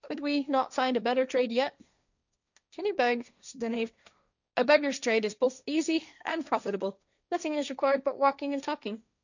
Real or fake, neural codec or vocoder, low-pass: fake; codec, 16 kHz, 1.1 kbps, Voila-Tokenizer; 7.2 kHz